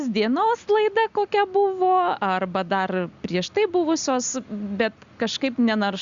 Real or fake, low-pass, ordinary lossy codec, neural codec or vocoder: real; 7.2 kHz; Opus, 64 kbps; none